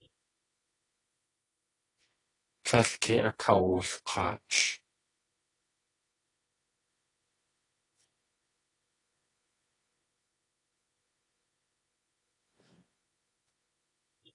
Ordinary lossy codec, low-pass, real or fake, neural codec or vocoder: AAC, 32 kbps; 10.8 kHz; fake; codec, 24 kHz, 0.9 kbps, WavTokenizer, medium music audio release